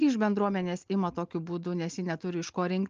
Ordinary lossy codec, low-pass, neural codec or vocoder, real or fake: Opus, 24 kbps; 7.2 kHz; none; real